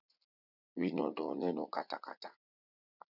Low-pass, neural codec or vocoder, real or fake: 5.4 kHz; vocoder, 44.1 kHz, 80 mel bands, Vocos; fake